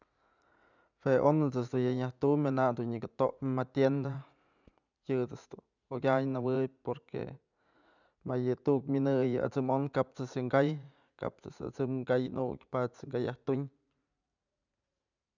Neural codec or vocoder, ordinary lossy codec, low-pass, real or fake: vocoder, 44.1 kHz, 80 mel bands, Vocos; none; 7.2 kHz; fake